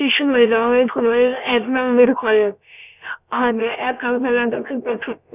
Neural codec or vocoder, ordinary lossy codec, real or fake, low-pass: codec, 16 kHz, about 1 kbps, DyCAST, with the encoder's durations; none; fake; 3.6 kHz